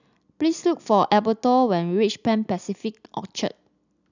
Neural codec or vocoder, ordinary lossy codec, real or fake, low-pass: none; none; real; 7.2 kHz